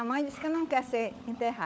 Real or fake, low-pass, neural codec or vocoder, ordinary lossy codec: fake; none; codec, 16 kHz, 16 kbps, FunCodec, trained on LibriTTS, 50 frames a second; none